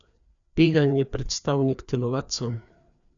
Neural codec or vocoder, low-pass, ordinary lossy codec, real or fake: codec, 16 kHz, 2 kbps, FreqCodec, larger model; 7.2 kHz; none; fake